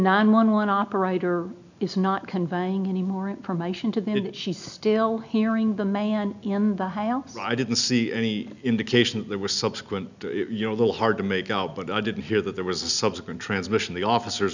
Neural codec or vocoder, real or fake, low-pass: none; real; 7.2 kHz